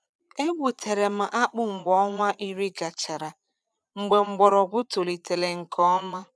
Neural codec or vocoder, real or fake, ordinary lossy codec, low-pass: vocoder, 22.05 kHz, 80 mel bands, Vocos; fake; none; none